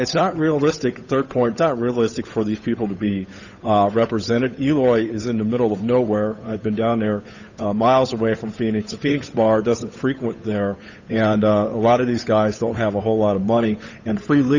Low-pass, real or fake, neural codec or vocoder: 7.2 kHz; fake; codec, 16 kHz, 8 kbps, FunCodec, trained on Chinese and English, 25 frames a second